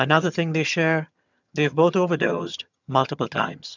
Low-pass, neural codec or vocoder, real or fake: 7.2 kHz; vocoder, 22.05 kHz, 80 mel bands, HiFi-GAN; fake